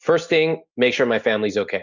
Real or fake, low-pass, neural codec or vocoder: real; 7.2 kHz; none